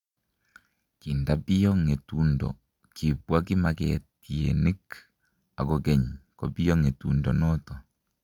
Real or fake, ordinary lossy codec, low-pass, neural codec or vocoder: real; MP3, 96 kbps; 19.8 kHz; none